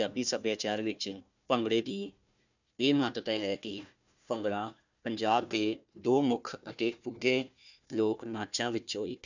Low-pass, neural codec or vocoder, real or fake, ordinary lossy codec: 7.2 kHz; codec, 16 kHz, 1 kbps, FunCodec, trained on Chinese and English, 50 frames a second; fake; none